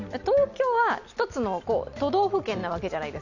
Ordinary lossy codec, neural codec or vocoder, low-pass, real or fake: none; none; 7.2 kHz; real